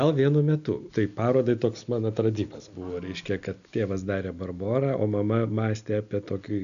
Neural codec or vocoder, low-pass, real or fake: none; 7.2 kHz; real